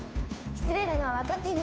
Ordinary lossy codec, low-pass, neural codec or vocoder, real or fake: none; none; codec, 16 kHz, 2 kbps, FunCodec, trained on Chinese and English, 25 frames a second; fake